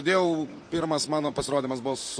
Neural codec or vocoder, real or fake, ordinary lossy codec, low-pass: vocoder, 44.1 kHz, 128 mel bands, Pupu-Vocoder; fake; MP3, 48 kbps; 9.9 kHz